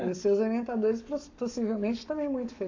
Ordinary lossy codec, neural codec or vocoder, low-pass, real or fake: AAC, 48 kbps; codec, 44.1 kHz, 7.8 kbps, Pupu-Codec; 7.2 kHz; fake